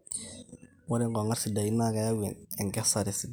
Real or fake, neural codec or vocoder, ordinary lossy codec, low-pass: real; none; none; none